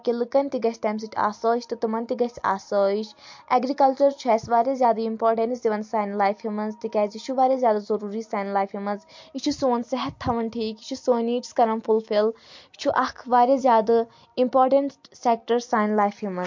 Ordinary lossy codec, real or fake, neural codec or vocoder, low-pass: MP3, 48 kbps; real; none; 7.2 kHz